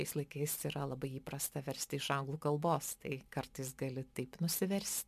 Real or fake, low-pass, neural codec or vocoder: real; 14.4 kHz; none